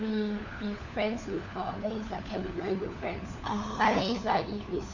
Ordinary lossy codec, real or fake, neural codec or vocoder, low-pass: none; fake; codec, 16 kHz, 4 kbps, FunCodec, trained on LibriTTS, 50 frames a second; 7.2 kHz